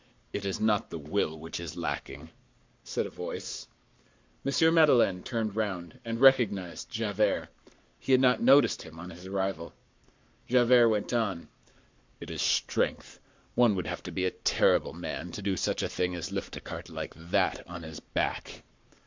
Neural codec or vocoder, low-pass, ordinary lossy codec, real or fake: codec, 44.1 kHz, 7.8 kbps, Pupu-Codec; 7.2 kHz; MP3, 64 kbps; fake